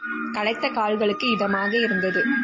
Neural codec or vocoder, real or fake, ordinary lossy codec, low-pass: none; real; MP3, 32 kbps; 7.2 kHz